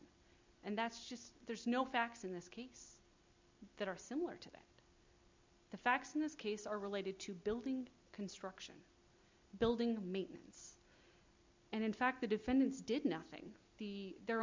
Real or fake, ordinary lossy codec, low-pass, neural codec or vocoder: real; MP3, 64 kbps; 7.2 kHz; none